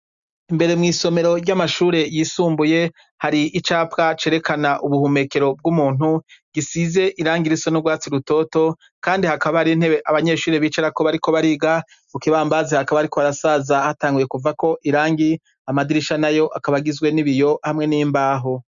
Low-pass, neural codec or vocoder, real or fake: 7.2 kHz; none; real